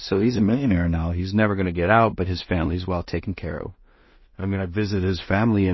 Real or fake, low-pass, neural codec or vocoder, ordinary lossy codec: fake; 7.2 kHz; codec, 16 kHz in and 24 kHz out, 0.4 kbps, LongCat-Audio-Codec, two codebook decoder; MP3, 24 kbps